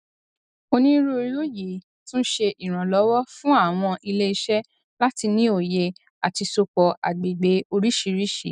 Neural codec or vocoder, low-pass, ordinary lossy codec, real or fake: none; 9.9 kHz; none; real